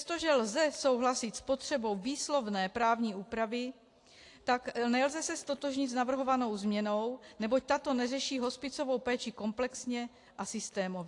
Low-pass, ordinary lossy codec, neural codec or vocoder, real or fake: 10.8 kHz; AAC, 48 kbps; none; real